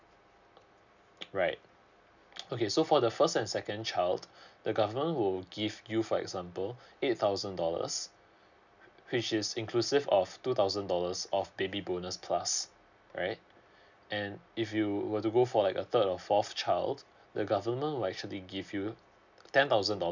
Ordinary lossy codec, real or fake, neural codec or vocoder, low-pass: none; real; none; 7.2 kHz